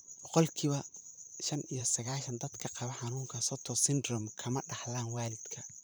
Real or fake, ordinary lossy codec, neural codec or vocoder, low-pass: fake; none; vocoder, 44.1 kHz, 128 mel bands, Pupu-Vocoder; none